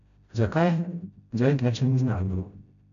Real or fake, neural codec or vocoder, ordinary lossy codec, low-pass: fake; codec, 16 kHz, 0.5 kbps, FreqCodec, smaller model; none; 7.2 kHz